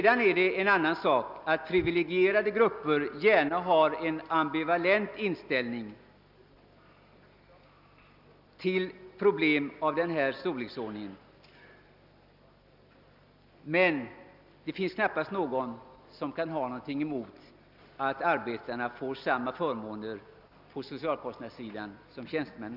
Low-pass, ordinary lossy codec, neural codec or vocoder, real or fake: 5.4 kHz; none; none; real